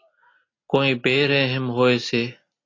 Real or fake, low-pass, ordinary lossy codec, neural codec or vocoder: real; 7.2 kHz; AAC, 32 kbps; none